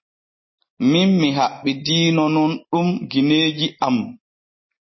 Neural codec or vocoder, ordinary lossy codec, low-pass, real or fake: none; MP3, 24 kbps; 7.2 kHz; real